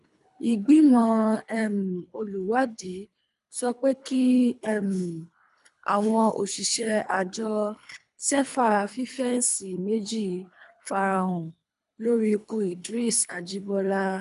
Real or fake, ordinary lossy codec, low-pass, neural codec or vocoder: fake; none; 10.8 kHz; codec, 24 kHz, 3 kbps, HILCodec